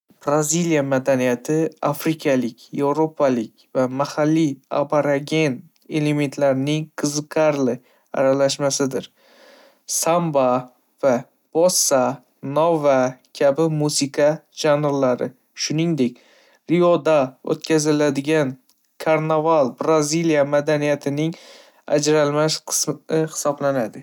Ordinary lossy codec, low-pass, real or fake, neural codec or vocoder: none; 19.8 kHz; real; none